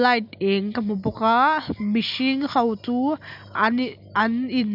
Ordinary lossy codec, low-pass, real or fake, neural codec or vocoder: none; 5.4 kHz; real; none